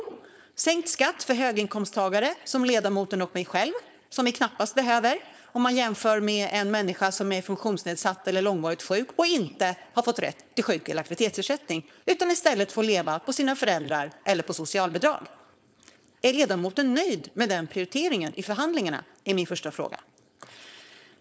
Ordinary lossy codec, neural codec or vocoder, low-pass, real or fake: none; codec, 16 kHz, 4.8 kbps, FACodec; none; fake